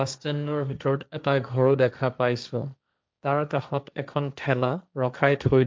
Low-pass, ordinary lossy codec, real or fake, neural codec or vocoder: none; none; fake; codec, 16 kHz, 1.1 kbps, Voila-Tokenizer